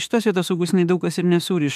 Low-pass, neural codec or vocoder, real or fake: 14.4 kHz; autoencoder, 48 kHz, 32 numbers a frame, DAC-VAE, trained on Japanese speech; fake